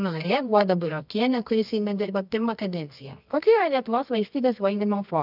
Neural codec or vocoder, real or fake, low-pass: codec, 24 kHz, 0.9 kbps, WavTokenizer, medium music audio release; fake; 5.4 kHz